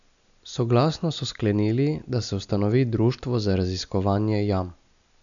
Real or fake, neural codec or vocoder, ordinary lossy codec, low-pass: real; none; none; 7.2 kHz